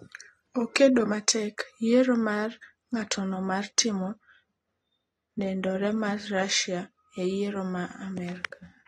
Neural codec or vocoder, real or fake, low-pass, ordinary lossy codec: none; real; 19.8 kHz; AAC, 32 kbps